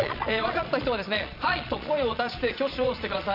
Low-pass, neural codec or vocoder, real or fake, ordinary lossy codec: 5.4 kHz; vocoder, 22.05 kHz, 80 mel bands, Vocos; fake; none